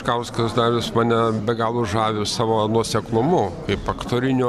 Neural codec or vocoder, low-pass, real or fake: none; 14.4 kHz; real